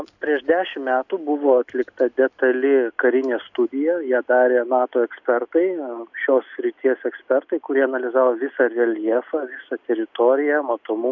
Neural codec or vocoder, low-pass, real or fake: none; 7.2 kHz; real